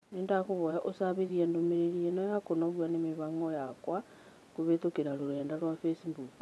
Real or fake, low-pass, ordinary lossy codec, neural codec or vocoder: fake; none; none; vocoder, 24 kHz, 100 mel bands, Vocos